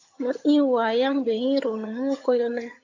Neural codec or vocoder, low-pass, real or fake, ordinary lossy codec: vocoder, 22.05 kHz, 80 mel bands, HiFi-GAN; 7.2 kHz; fake; AAC, 48 kbps